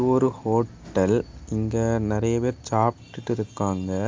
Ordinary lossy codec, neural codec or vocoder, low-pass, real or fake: Opus, 32 kbps; none; 7.2 kHz; real